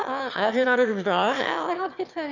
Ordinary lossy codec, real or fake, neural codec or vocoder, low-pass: none; fake; autoencoder, 22.05 kHz, a latent of 192 numbers a frame, VITS, trained on one speaker; 7.2 kHz